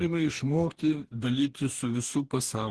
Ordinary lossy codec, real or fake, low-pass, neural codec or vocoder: Opus, 16 kbps; fake; 10.8 kHz; codec, 44.1 kHz, 2.6 kbps, DAC